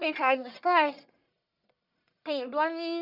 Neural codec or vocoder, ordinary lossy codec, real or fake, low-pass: codec, 44.1 kHz, 1.7 kbps, Pupu-Codec; none; fake; 5.4 kHz